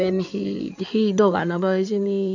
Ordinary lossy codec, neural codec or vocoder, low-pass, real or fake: none; codec, 16 kHz in and 24 kHz out, 2.2 kbps, FireRedTTS-2 codec; 7.2 kHz; fake